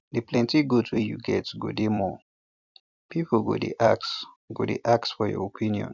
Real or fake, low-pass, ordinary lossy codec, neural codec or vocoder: fake; 7.2 kHz; none; vocoder, 44.1 kHz, 128 mel bands every 256 samples, BigVGAN v2